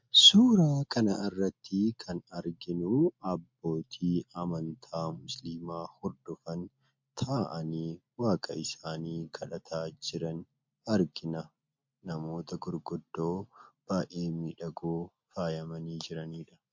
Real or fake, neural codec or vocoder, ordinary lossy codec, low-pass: real; none; MP3, 48 kbps; 7.2 kHz